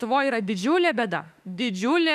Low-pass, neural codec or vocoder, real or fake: 14.4 kHz; autoencoder, 48 kHz, 32 numbers a frame, DAC-VAE, trained on Japanese speech; fake